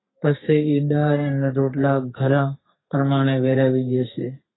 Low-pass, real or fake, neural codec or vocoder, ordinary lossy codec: 7.2 kHz; fake; codec, 32 kHz, 1.9 kbps, SNAC; AAC, 16 kbps